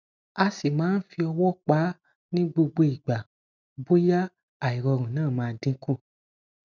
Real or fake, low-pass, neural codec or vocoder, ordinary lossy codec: real; 7.2 kHz; none; none